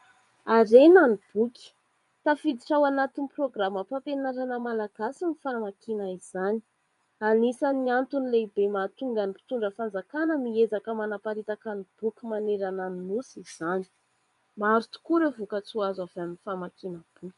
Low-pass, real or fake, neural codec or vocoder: 10.8 kHz; fake; vocoder, 24 kHz, 100 mel bands, Vocos